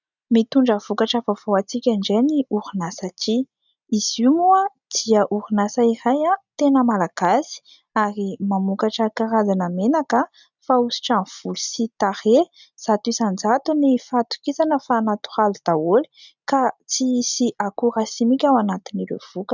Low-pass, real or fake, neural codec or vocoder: 7.2 kHz; real; none